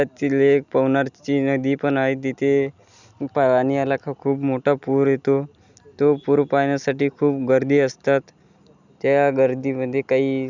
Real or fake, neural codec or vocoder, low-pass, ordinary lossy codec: real; none; 7.2 kHz; none